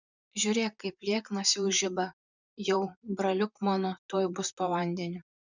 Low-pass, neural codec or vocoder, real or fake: 7.2 kHz; vocoder, 44.1 kHz, 128 mel bands, Pupu-Vocoder; fake